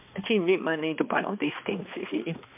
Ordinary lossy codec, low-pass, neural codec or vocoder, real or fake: MP3, 32 kbps; 3.6 kHz; codec, 16 kHz, 2 kbps, X-Codec, HuBERT features, trained on balanced general audio; fake